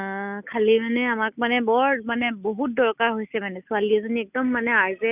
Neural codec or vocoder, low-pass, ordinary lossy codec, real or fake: none; 3.6 kHz; none; real